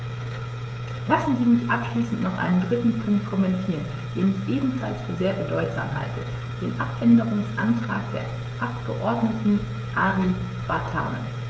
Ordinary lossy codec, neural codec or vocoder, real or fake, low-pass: none; codec, 16 kHz, 16 kbps, FreqCodec, smaller model; fake; none